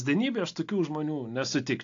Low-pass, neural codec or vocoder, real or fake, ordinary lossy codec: 7.2 kHz; none; real; AAC, 48 kbps